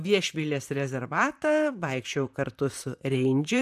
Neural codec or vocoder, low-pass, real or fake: vocoder, 44.1 kHz, 128 mel bands, Pupu-Vocoder; 14.4 kHz; fake